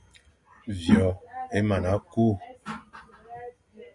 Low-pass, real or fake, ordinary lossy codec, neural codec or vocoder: 10.8 kHz; real; Opus, 64 kbps; none